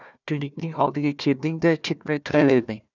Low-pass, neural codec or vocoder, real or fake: 7.2 kHz; codec, 16 kHz, 1 kbps, FunCodec, trained on Chinese and English, 50 frames a second; fake